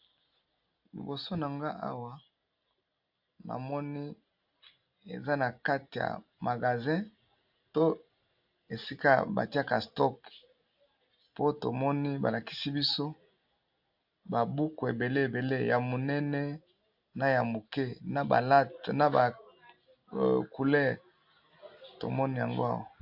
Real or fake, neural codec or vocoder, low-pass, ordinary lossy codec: real; none; 5.4 kHz; AAC, 48 kbps